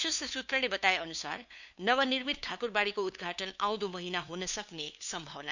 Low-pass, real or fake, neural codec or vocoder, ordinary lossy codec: 7.2 kHz; fake; codec, 16 kHz, 2 kbps, FunCodec, trained on LibriTTS, 25 frames a second; none